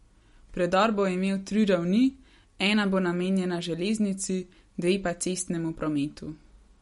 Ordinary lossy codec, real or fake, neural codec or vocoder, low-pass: MP3, 48 kbps; real; none; 19.8 kHz